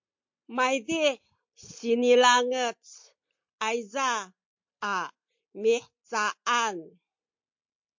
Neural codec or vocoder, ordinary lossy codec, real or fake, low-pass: none; MP3, 48 kbps; real; 7.2 kHz